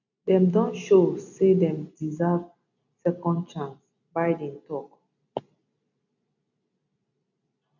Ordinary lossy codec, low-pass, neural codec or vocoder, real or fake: none; 7.2 kHz; none; real